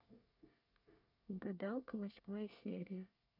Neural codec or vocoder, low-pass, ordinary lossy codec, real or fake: codec, 24 kHz, 1 kbps, SNAC; 5.4 kHz; none; fake